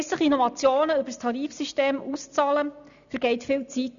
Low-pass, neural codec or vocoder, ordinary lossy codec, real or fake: 7.2 kHz; none; none; real